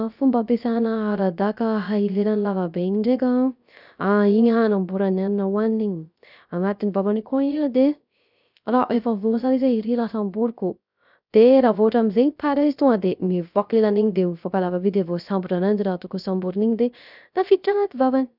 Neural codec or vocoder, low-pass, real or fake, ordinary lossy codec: codec, 16 kHz, 0.3 kbps, FocalCodec; 5.4 kHz; fake; none